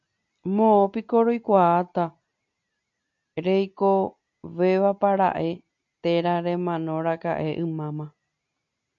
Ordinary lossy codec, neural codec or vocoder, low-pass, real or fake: AAC, 64 kbps; none; 7.2 kHz; real